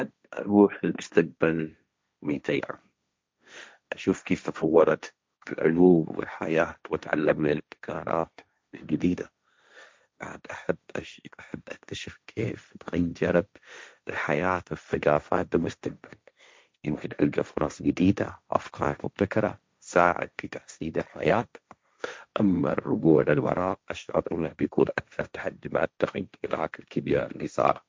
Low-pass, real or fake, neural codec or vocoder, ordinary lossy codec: none; fake; codec, 16 kHz, 1.1 kbps, Voila-Tokenizer; none